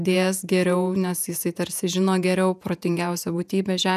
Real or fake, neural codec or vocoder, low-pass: fake; vocoder, 48 kHz, 128 mel bands, Vocos; 14.4 kHz